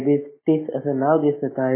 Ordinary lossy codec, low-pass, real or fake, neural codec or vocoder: MP3, 16 kbps; 3.6 kHz; real; none